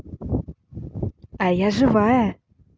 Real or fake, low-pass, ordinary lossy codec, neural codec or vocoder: real; none; none; none